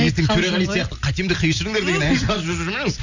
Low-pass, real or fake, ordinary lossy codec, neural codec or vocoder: 7.2 kHz; real; none; none